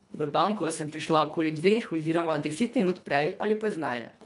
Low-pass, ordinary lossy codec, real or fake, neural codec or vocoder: 10.8 kHz; MP3, 96 kbps; fake; codec, 24 kHz, 1.5 kbps, HILCodec